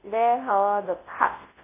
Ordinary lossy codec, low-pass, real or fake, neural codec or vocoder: MP3, 16 kbps; 3.6 kHz; fake; codec, 24 kHz, 0.9 kbps, WavTokenizer, large speech release